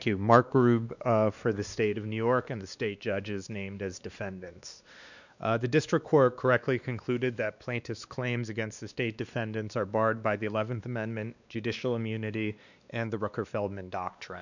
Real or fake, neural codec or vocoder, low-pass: fake; codec, 16 kHz, 2 kbps, X-Codec, WavLM features, trained on Multilingual LibriSpeech; 7.2 kHz